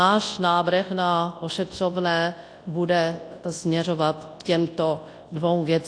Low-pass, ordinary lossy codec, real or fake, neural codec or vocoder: 9.9 kHz; AAC, 48 kbps; fake; codec, 24 kHz, 0.9 kbps, WavTokenizer, large speech release